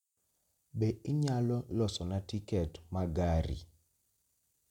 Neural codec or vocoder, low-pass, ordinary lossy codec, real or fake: none; 19.8 kHz; none; real